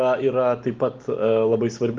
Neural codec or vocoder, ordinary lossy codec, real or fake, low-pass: none; Opus, 32 kbps; real; 7.2 kHz